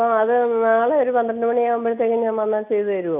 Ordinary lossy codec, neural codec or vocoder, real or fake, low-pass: MP3, 32 kbps; none; real; 3.6 kHz